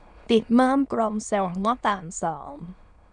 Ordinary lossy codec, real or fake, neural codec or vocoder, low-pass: none; fake; autoencoder, 22.05 kHz, a latent of 192 numbers a frame, VITS, trained on many speakers; 9.9 kHz